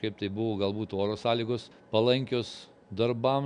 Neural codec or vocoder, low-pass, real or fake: none; 9.9 kHz; real